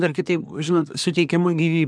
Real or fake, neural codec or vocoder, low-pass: fake; codec, 24 kHz, 1 kbps, SNAC; 9.9 kHz